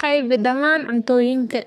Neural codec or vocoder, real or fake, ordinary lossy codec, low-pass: codec, 32 kHz, 1.9 kbps, SNAC; fake; none; 14.4 kHz